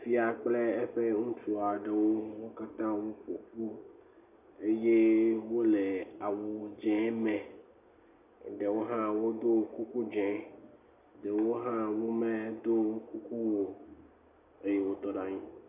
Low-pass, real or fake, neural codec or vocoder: 3.6 kHz; real; none